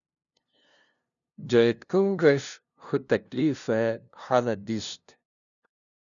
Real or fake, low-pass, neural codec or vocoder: fake; 7.2 kHz; codec, 16 kHz, 0.5 kbps, FunCodec, trained on LibriTTS, 25 frames a second